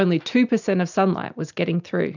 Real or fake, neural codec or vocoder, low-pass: real; none; 7.2 kHz